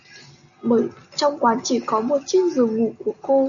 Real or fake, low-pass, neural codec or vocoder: real; 7.2 kHz; none